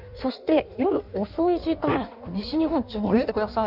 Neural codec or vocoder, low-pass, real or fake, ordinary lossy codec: codec, 16 kHz in and 24 kHz out, 1.1 kbps, FireRedTTS-2 codec; 5.4 kHz; fake; none